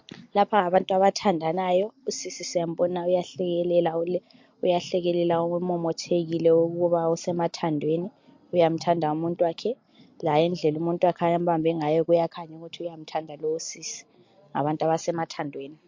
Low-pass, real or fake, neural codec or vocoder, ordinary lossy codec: 7.2 kHz; real; none; MP3, 48 kbps